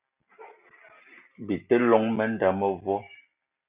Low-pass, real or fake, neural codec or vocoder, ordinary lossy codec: 3.6 kHz; real; none; Opus, 64 kbps